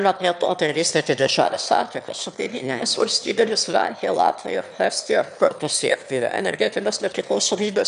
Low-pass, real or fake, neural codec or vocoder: 9.9 kHz; fake; autoencoder, 22.05 kHz, a latent of 192 numbers a frame, VITS, trained on one speaker